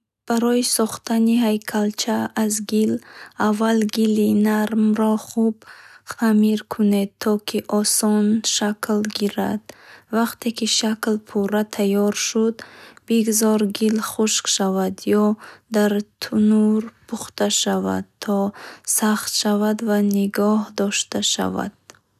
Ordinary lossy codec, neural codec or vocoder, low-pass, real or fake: none; none; 14.4 kHz; real